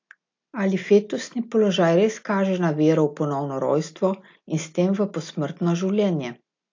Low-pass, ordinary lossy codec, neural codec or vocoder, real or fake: 7.2 kHz; AAC, 48 kbps; none; real